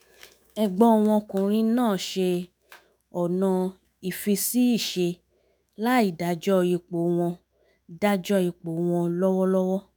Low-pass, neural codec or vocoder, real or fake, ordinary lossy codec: none; autoencoder, 48 kHz, 128 numbers a frame, DAC-VAE, trained on Japanese speech; fake; none